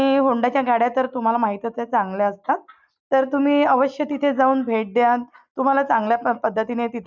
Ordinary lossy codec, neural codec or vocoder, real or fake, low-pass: none; none; real; 7.2 kHz